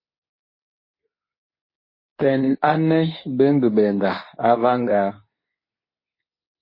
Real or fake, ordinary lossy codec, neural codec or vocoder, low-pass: fake; MP3, 24 kbps; codec, 24 kHz, 0.9 kbps, WavTokenizer, medium speech release version 2; 5.4 kHz